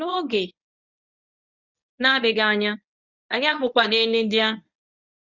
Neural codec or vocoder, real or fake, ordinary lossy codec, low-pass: codec, 24 kHz, 0.9 kbps, WavTokenizer, medium speech release version 1; fake; none; 7.2 kHz